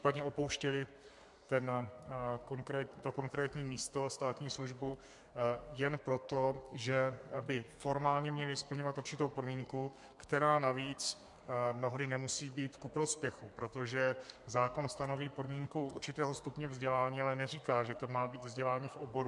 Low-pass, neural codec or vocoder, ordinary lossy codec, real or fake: 10.8 kHz; codec, 32 kHz, 1.9 kbps, SNAC; MP3, 64 kbps; fake